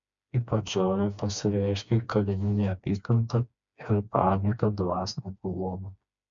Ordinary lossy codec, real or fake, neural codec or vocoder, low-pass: MP3, 64 kbps; fake; codec, 16 kHz, 2 kbps, FreqCodec, smaller model; 7.2 kHz